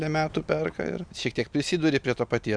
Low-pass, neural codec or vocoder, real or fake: 9.9 kHz; none; real